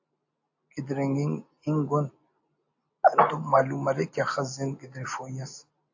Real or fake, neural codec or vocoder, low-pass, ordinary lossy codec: real; none; 7.2 kHz; AAC, 48 kbps